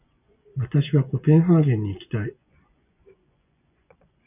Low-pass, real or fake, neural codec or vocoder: 3.6 kHz; fake; vocoder, 24 kHz, 100 mel bands, Vocos